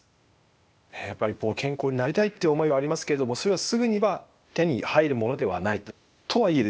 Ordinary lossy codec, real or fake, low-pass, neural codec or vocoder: none; fake; none; codec, 16 kHz, 0.8 kbps, ZipCodec